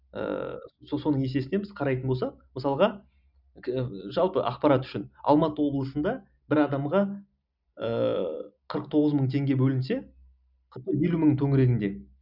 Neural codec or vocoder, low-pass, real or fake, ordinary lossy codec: none; 5.4 kHz; real; none